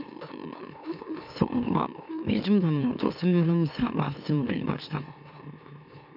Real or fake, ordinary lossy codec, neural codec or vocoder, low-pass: fake; none; autoencoder, 44.1 kHz, a latent of 192 numbers a frame, MeloTTS; 5.4 kHz